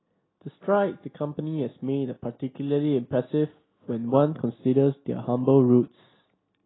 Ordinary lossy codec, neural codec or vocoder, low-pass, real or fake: AAC, 16 kbps; none; 7.2 kHz; real